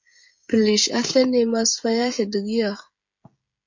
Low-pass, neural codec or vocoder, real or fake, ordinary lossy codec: 7.2 kHz; codec, 16 kHz, 16 kbps, FreqCodec, smaller model; fake; MP3, 48 kbps